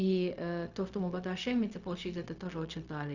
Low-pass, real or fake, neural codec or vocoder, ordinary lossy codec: 7.2 kHz; fake; codec, 16 kHz, 0.4 kbps, LongCat-Audio-Codec; Opus, 64 kbps